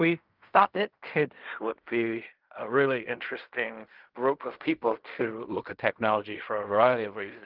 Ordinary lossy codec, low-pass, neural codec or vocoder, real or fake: Opus, 24 kbps; 5.4 kHz; codec, 16 kHz in and 24 kHz out, 0.4 kbps, LongCat-Audio-Codec, fine tuned four codebook decoder; fake